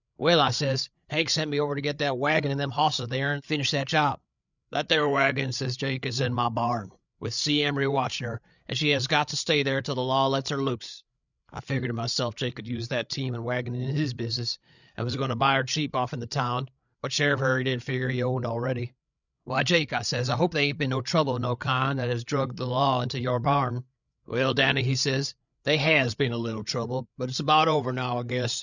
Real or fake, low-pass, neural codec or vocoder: fake; 7.2 kHz; codec, 16 kHz, 8 kbps, FreqCodec, larger model